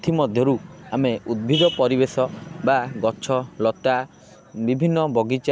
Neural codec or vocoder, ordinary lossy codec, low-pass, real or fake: none; none; none; real